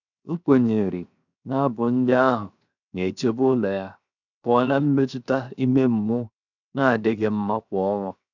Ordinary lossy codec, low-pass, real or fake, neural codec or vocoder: none; 7.2 kHz; fake; codec, 16 kHz, 0.7 kbps, FocalCodec